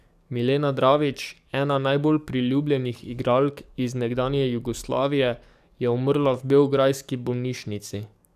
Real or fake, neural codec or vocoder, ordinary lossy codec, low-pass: fake; codec, 44.1 kHz, 7.8 kbps, DAC; none; 14.4 kHz